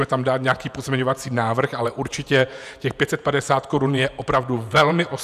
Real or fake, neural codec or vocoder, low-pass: fake; vocoder, 48 kHz, 128 mel bands, Vocos; 14.4 kHz